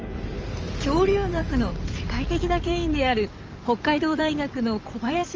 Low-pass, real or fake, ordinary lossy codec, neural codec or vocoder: 7.2 kHz; fake; Opus, 24 kbps; codec, 16 kHz in and 24 kHz out, 2.2 kbps, FireRedTTS-2 codec